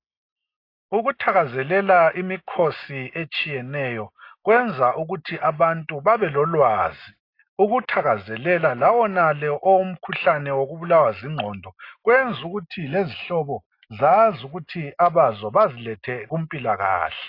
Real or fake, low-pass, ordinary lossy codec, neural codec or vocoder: real; 5.4 kHz; AAC, 32 kbps; none